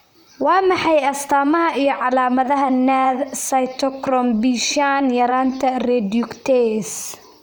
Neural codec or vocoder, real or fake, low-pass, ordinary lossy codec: vocoder, 44.1 kHz, 128 mel bands, Pupu-Vocoder; fake; none; none